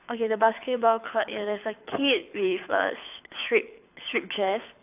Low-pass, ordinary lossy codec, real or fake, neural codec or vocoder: 3.6 kHz; AAC, 32 kbps; fake; codec, 24 kHz, 6 kbps, HILCodec